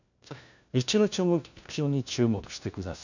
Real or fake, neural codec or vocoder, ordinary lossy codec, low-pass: fake; codec, 16 kHz, 1 kbps, FunCodec, trained on LibriTTS, 50 frames a second; none; 7.2 kHz